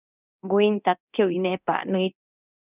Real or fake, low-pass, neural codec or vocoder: fake; 3.6 kHz; codec, 24 kHz, 0.9 kbps, DualCodec